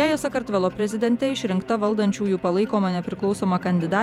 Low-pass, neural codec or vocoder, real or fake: 19.8 kHz; vocoder, 48 kHz, 128 mel bands, Vocos; fake